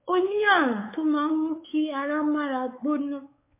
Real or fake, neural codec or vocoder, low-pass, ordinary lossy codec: fake; codec, 16 kHz, 4 kbps, X-Codec, WavLM features, trained on Multilingual LibriSpeech; 3.6 kHz; MP3, 24 kbps